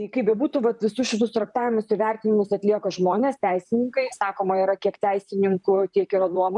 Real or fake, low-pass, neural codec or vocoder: fake; 10.8 kHz; vocoder, 44.1 kHz, 128 mel bands, Pupu-Vocoder